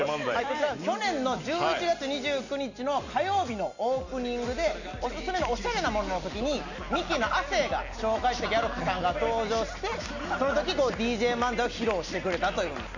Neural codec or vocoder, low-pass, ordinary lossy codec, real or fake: none; 7.2 kHz; none; real